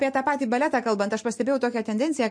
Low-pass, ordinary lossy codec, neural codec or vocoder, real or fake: 9.9 kHz; MP3, 48 kbps; none; real